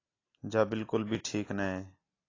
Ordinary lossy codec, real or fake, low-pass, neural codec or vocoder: AAC, 32 kbps; real; 7.2 kHz; none